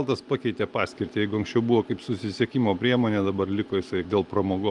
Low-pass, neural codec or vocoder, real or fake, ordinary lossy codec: 10.8 kHz; none; real; Opus, 32 kbps